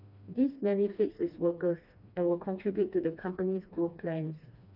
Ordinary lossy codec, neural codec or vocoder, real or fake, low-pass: none; codec, 16 kHz, 2 kbps, FreqCodec, smaller model; fake; 5.4 kHz